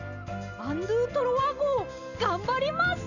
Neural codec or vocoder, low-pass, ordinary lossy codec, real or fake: none; 7.2 kHz; none; real